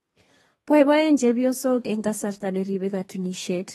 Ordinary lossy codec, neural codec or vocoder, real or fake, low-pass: AAC, 32 kbps; codec, 32 kHz, 1.9 kbps, SNAC; fake; 14.4 kHz